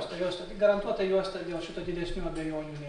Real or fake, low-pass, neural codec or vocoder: real; 9.9 kHz; none